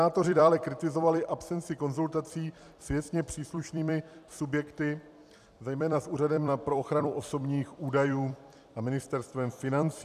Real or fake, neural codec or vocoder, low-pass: fake; vocoder, 44.1 kHz, 128 mel bands every 256 samples, BigVGAN v2; 14.4 kHz